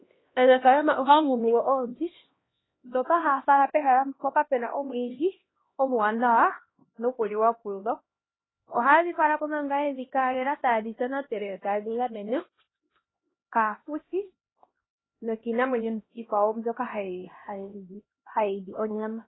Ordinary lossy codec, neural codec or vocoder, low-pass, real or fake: AAC, 16 kbps; codec, 16 kHz, 1 kbps, X-Codec, HuBERT features, trained on LibriSpeech; 7.2 kHz; fake